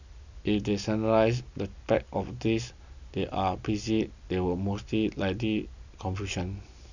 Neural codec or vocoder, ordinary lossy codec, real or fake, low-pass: none; none; real; 7.2 kHz